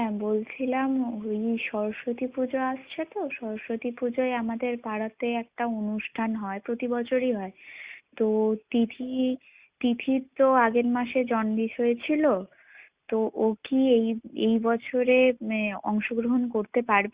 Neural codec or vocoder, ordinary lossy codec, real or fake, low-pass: none; Opus, 64 kbps; real; 3.6 kHz